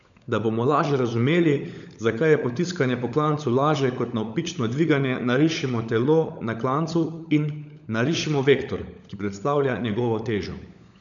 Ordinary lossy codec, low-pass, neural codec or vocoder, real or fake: none; 7.2 kHz; codec, 16 kHz, 16 kbps, FunCodec, trained on LibriTTS, 50 frames a second; fake